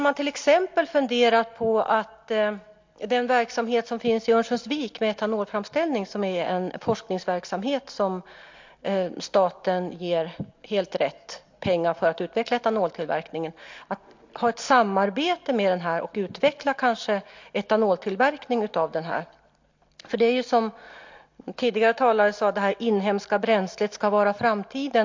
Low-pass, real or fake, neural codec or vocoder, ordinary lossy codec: 7.2 kHz; real; none; MP3, 48 kbps